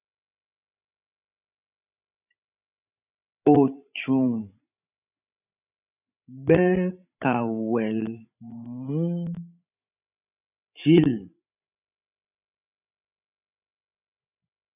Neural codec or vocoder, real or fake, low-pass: codec, 16 kHz, 16 kbps, FreqCodec, larger model; fake; 3.6 kHz